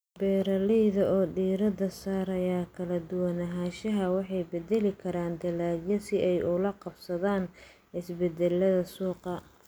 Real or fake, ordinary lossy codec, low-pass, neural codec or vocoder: real; none; none; none